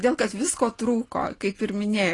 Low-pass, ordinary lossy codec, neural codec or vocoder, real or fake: 10.8 kHz; AAC, 32 kbps; none; real